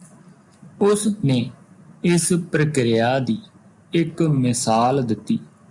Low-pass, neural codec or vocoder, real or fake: 10.8 kHz; none; real